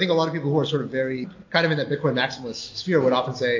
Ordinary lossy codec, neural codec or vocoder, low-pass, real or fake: AAC, 48 kbps; none; 7.2 kHz; real